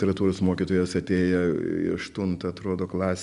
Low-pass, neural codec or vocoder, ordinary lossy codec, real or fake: 10.8 kHz; none; Opus, 64 kbps; real